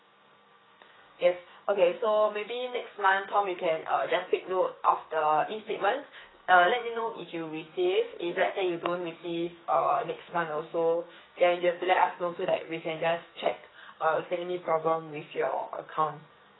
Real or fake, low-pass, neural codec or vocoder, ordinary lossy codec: fake; 7.2 kHz; codec, 44.1 kHz, 2.6 kbps, SNAC; AAC, 16 kbps